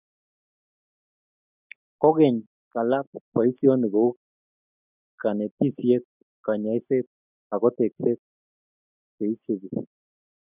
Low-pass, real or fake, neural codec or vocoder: 3.6 kHz; real; none